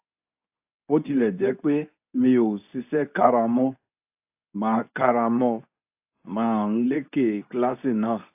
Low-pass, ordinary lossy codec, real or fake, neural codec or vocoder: 3.6 kHz; AAC, 32 kbps; fake; codec, 24 kHz, 0.9 kbps, WavTokenizer, medium speech release version 2